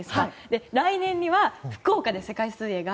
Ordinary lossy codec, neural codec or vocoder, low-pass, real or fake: none; none; none; real